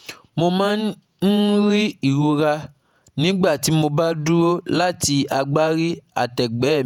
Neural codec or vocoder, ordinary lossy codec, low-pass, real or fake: vocoder, 48 kHz, 128 mel bands, Vocos; none; 19.8 kHz; fake